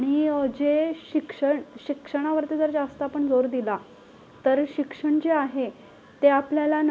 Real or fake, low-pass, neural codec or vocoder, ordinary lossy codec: real; none; none; none